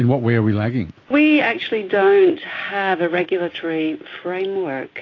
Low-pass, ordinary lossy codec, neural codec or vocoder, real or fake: 7.2 kHz; AAC, 32 kbps; none; real